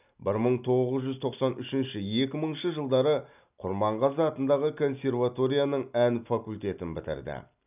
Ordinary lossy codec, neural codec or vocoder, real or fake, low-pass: none; none; real; 3.6 kHz